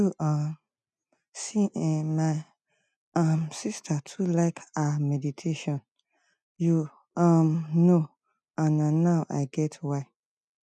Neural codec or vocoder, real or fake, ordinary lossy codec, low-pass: none; real; none; none